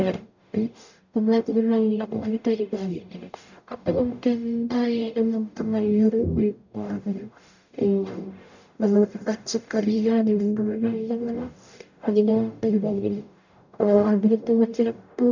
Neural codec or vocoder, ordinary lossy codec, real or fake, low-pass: codec, 44.1 kHz, 0.9 kbps, DAC; none; fake; 7.2 kHz